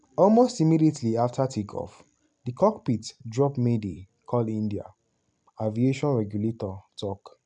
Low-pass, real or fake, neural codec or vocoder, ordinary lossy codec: 9.9 kHz; real; none; none